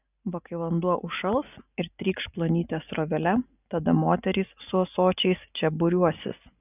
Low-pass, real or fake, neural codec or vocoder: 3.6 kHz; real; none